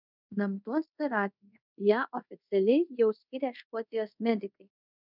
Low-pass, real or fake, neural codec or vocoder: 5.4 kHz; fake; codec, 24 kHz, 0.5 kbps, DualCodec